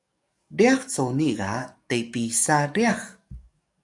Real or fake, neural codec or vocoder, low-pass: fake; codec, 44.1 kHz, 7.8 kbps, DAC; 10.8 kHz